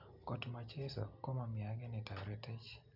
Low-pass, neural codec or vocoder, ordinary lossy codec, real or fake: 5.4 kHz; none; none; real